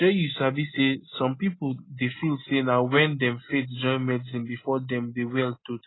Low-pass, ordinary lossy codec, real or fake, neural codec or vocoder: 7.2 kHz; AAC, 16 kbps; real; none